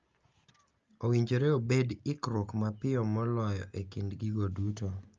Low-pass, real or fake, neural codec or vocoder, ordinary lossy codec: 7.2 kHz; real; none; Opus, 24 kbps